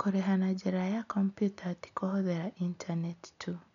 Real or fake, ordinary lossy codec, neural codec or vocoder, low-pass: real; none; none; 7.2 kHz